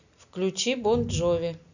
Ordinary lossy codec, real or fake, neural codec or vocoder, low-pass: none; real; none; 7.2 kHz